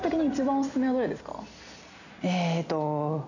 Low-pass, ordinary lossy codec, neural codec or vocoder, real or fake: 7.2 kHz; none; none; real